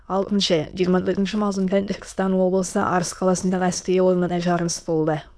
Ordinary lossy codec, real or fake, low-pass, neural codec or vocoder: none; fake; none; autoencoder, 22.05 kHz, a latent of 192 numbers a frame, VITS, trained on many speakers